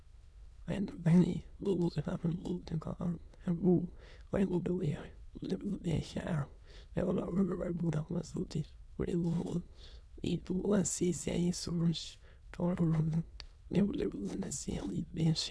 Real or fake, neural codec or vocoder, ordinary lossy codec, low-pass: fake; autoencoder, 22.05 kHz, a latent of 192 numbers a frame, VITS, trained on many speakers; none; none